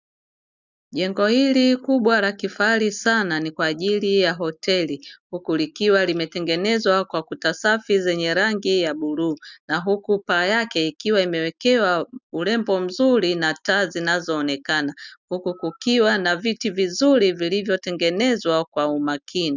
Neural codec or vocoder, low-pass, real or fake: none; 7.2 kHz; real